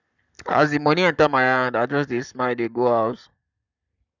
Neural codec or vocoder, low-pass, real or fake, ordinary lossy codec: codec, 44.1 kHz, 7.8 kbps, Pupu-Codec; 7.2 kHz; fake; none